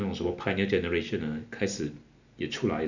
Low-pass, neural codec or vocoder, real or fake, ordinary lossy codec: 7.2 kHz; none; real; none